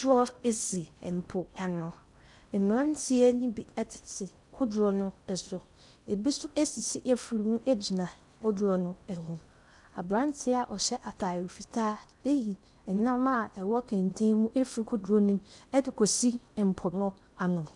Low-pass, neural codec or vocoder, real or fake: 10.8 kHz; codec, 16 kHz in and 24 kHz out, 0.6 kbps, FocalCodec, streaming, 4096 codes; fake